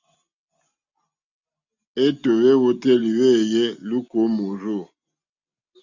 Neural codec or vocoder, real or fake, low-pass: none; real; 7.2 kHz